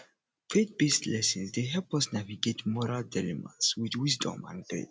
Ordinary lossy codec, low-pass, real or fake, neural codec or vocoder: none; none; real; none